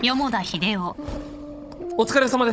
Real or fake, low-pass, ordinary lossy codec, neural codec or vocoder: fake; none; none; codec, 16 kHz, 16 kbps, FunCodec, trained on Chinese and English, 50 frames a second